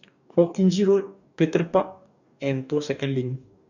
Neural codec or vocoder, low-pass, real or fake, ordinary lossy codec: codec, 44.1 kHz, 2.6 kbps, DAC; 7.2 kHz; fake; none